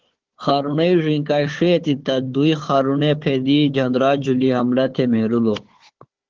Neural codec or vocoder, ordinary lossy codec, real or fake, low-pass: codec, 16 kHz, 4 kbps, FunCodec, trained on Chinese and English, 50 frames a second; Opus, 16 kbps; fake; 7.2 kHz